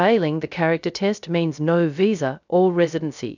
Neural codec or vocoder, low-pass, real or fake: codec, 16 kHz, 0.3 kbps, FocalCodec; 7.2 kHz; fake